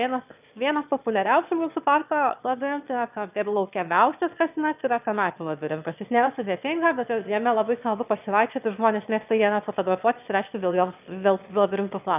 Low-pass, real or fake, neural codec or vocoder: 3.6 kHz; fake; autoencoder, 22.05 kHz, a latent of 192 numbers a frame, VITS, trained on one speaker